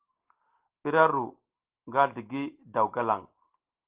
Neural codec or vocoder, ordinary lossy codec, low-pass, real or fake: none; Opus, 16 kbps; 3.6 kHz; real